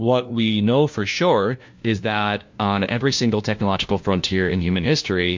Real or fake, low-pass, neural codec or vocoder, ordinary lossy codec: fake; 7.2 kHz; codec, 16 kHz, 1 kbps, FunCodec, trained on LibriTTS, 50 frames a second; MP3, 48 kbps